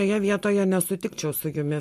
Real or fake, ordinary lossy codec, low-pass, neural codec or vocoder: real; MP3, 64 kbps; 14.4 kHz; none